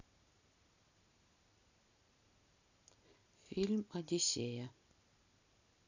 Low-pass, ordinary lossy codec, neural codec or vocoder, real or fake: 7.2 kHz; none; none; real